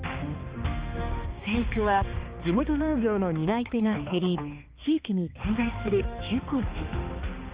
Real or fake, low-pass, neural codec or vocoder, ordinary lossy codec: fake; 3.6 kHz; codec, 16 kHz, 2 kbps, X-Codec, HuBERT features, trained on balanced general audio; Opus, 32 kbps